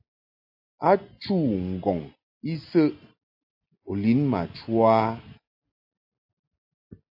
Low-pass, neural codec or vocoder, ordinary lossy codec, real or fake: 5.4 kHz; none; AAC, 48 kbps; real